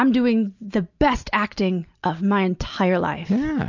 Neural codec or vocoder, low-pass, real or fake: none; 7.2 kHz; real